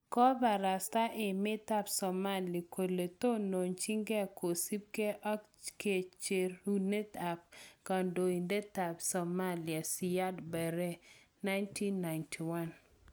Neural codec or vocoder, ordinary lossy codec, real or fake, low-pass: none; none; real; none